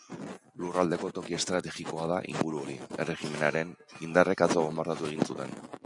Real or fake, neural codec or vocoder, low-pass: real; none; 10.8 kHz